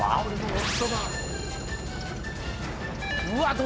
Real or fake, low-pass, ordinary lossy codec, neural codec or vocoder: real; none; none; none